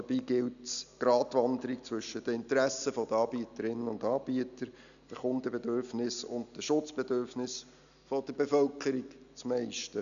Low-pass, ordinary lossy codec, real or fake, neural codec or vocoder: 7.2 kHz; none; real; none